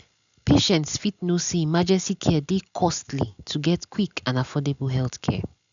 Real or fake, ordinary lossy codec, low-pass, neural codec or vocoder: real; none; 7.2 kHz; none